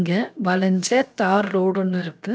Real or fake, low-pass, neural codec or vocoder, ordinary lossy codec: fake; none; codec, 16 kHz, 0.7 kbps, FocalCodec; none